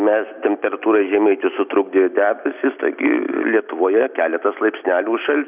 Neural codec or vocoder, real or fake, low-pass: none; real; 3.6 kHz